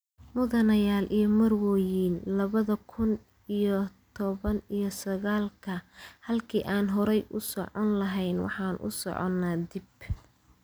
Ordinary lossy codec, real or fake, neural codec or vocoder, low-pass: none; real; none; none